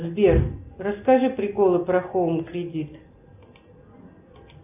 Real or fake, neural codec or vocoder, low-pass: real; none; 3.6 kHz